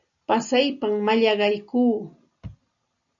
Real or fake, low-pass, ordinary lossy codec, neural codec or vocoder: real; 7.2 kHz; MP3, 96 kbps; none